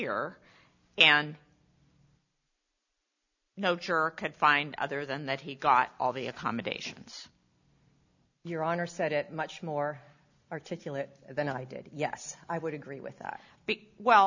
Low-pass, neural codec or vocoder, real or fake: 7.2 kHz; none; real